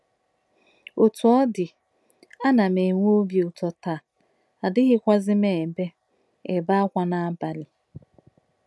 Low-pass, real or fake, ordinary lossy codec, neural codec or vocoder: none; real; none; none